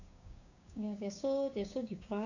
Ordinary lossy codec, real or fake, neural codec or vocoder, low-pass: none; fake; codec, 16 kHz, 6 kbps, DAC; 7.2 kHz